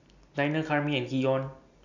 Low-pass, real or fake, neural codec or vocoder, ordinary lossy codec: 7.2 kHz; real; none; none